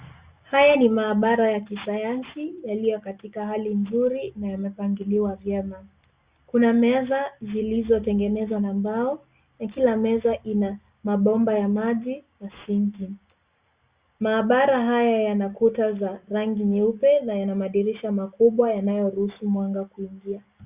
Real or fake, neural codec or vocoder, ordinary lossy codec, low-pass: real; none; Opus, 64 kbps; 3.6 kHz